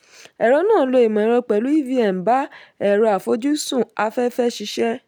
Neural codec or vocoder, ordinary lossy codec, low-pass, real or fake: none; none; none; real